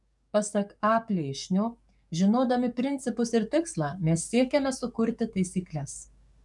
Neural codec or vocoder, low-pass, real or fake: codec, 44.1 kHz, 7.8 kbps, DAC; 10.8 kHz; fake